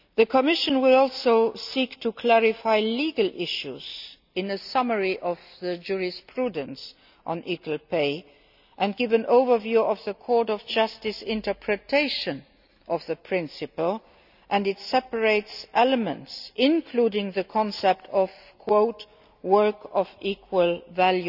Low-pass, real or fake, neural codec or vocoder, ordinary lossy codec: 5.4 kHz; real; none; none